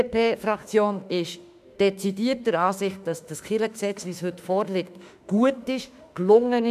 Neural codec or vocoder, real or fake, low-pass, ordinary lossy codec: autoencoder, 48 kHz, 32 numbers a frame, DAC-VAE, trained on Japanese speech; fake; 14.4 kHz; none